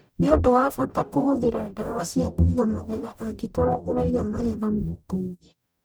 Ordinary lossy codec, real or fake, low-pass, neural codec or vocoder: none; fake; none; codec, 44.1 kHz, 0.9 kbps, DAC